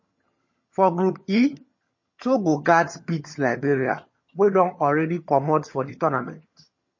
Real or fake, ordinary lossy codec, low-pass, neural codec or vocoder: fake; MP3, 32 kbps; 7.2 kHz; vocoder, 22.05 kHz, 80 mel bands, HiFi-GAN